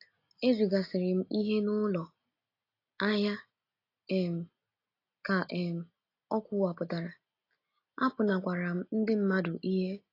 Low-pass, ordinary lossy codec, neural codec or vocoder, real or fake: 5.4 kHz; AAC, 32 kbps; none; real